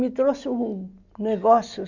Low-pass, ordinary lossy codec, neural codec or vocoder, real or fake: 7.2 kHz; none; none; real